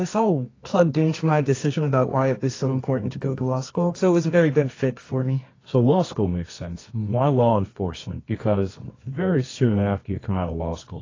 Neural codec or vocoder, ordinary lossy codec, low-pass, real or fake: codec, 24 kHz, 0.9 kbps, WavTokenizer, medium music audio release; AAC, 32 kbps; 7.2 kHz; fake